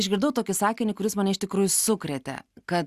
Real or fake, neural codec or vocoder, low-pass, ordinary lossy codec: real; none; 14.4 kHz; Opus, 64 kbps